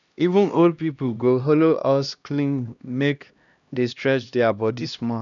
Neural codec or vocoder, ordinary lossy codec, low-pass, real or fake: codec, 16 kHz, 1 kbps, X-Codec, HuBERT features, trained on LibriSpeech; none; 7.2 kHz; fake